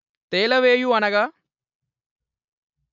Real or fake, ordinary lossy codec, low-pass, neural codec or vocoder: real; none; 7.2 kHz; none